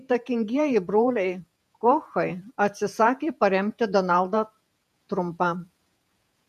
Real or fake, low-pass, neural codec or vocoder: fake; 14.4 kHz; vocoder, 48 kHz, 128 mel bands, Vocos